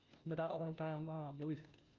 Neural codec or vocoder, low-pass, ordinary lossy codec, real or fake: codec, 16 kHz, 0.5 kbps, FunCodec, trained on Chinese and English, 25 frames a second; 7.2 kHz; Opus, 24 kbps; fake